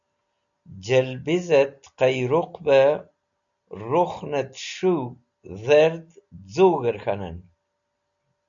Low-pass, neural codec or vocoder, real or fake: 7.2 kHz; none; real